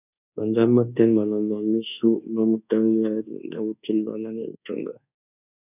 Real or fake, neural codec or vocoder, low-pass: fake; codec, 24 kHz, 1.2 kbps, DualCodec; 3.6 kHz